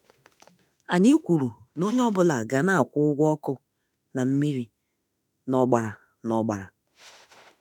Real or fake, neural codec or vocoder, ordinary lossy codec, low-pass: fake; autoencoder, 48 kHz, 32 numbers a frame, DAC-VAE, trained on Japanese speech; none; 19.8 kHz